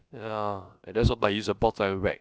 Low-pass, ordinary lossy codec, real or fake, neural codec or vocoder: none; none; fake; codec, 16 kHz, about 1 kbps, DyCAST, with the encoder's durations